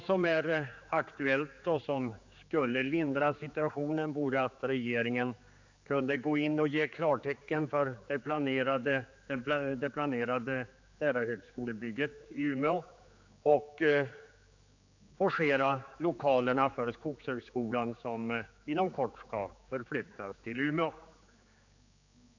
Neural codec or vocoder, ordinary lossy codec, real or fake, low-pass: codec, 16 kHz, 4 kbps, X-Codec, HuBERT features, trained on general audio; AAC, 48 kbps; fake; 7.2 kHz